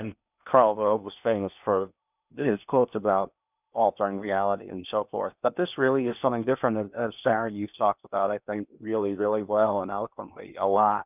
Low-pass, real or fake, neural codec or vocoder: 3.6 kHz; fake; codec, 16 kHz in and 24 kHz out, 0.8 kbps, FocalCodec, streaming, 65536 codes